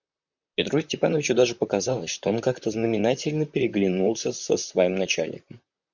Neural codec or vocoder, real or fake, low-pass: vocoder, 44.1 kHz, 128 mel bands, Pupu-Vocoder; fake; 7.2 kHz